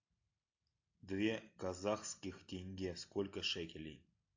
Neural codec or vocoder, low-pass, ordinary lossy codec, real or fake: none; 7.2 kHz; AAC, 48 kbps; real